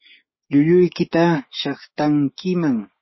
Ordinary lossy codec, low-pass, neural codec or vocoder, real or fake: MP3, 24 kbps; 7.2 kHz; codec, 16 kHz, 6 kbps, DAC; fake